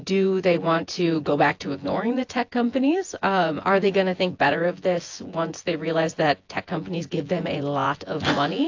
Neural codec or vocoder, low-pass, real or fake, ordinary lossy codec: vocoder, 24 kHz, 100 mel bands, Vocos; 7.2 kHz; fake; AAC, 48 kbps